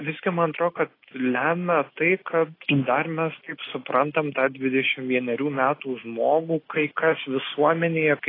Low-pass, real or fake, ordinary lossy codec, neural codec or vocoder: 5.4 kHz; real; AAC, 24 kbps; none